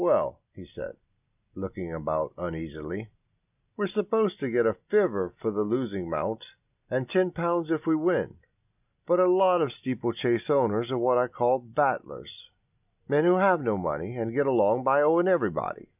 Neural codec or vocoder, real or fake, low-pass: none; real; 3.6 kHz